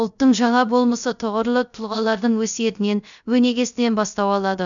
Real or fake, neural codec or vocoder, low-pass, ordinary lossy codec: fake; codec, 16 kHz, 0.3 kbps, FocalCodec; 7.2 kHz; none